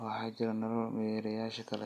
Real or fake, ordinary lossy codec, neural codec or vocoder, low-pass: real; AAC, 64 kbps; none; 14.4 kHz